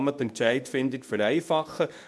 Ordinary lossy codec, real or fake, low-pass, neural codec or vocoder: none; fake; none; codec, 24 kHz, 0.9 kbps, WavTokenizer, medium speech release version 2